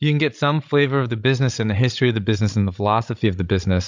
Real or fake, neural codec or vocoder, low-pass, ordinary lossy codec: fake; codec, 16 kHz, 16 kbps, FreqCodec, larger model; 7.2 kHz; MP3, 64 kbps